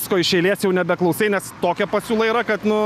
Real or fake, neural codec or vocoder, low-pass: real; none; 14.4 kHz